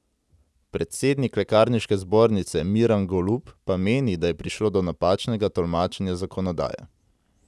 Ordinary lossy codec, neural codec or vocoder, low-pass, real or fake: none; none; none; real